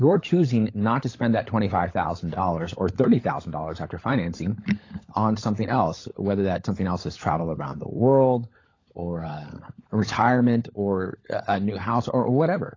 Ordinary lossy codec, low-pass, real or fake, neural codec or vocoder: AAC, 32 kbps; 7.2 kHz; fake; codec, 16 kHz, 16 kbps, FunCodec, trained on LibriTTS, 50 frames a second